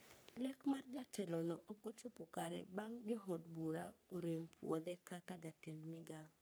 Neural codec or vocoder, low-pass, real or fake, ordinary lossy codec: codec, 44.1 kHz, 3.4 kbps, Pupu-Codec; none; fake; none